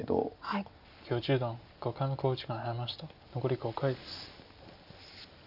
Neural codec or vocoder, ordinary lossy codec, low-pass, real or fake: none; none; 5.4 kHz; real